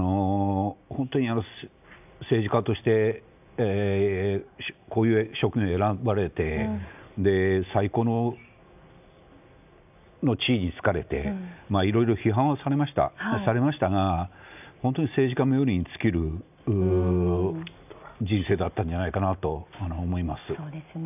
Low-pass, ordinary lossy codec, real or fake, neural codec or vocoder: 3.6 kHz; none; real; none